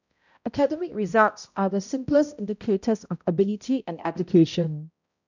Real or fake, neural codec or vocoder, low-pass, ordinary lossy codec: fake; codec, 16 kHz, 0.5 kbps, X-Codec, HuBERT features, trained on balanced general audio; 7.2 kHz; none